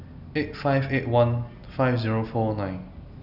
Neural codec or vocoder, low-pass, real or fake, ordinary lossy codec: none; 5.4 kHz; real; none